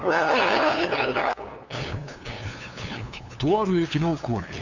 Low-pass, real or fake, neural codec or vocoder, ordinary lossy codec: 7.2 kHz; fake; codec, 16 kHz, 2 kbps, FunCodec, trained on LibriTTS, 25 frames a second; none